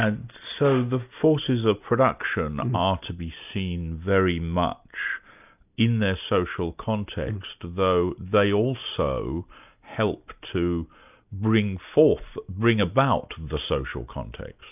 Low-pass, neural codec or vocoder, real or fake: 3.6 kHz; none; real